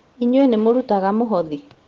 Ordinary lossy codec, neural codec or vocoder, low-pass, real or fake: Opus, 16 kbps; none; 7.2 kHz; real